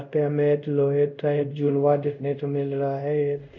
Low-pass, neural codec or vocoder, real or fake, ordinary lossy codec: 7.2 kHz; codec, 24 kHz, 0.5 kbps, DualCodec; fake; none